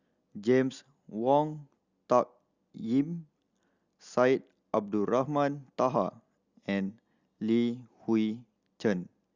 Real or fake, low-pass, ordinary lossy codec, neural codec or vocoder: real; 7.2 kHz; Opus, 64 kbps; none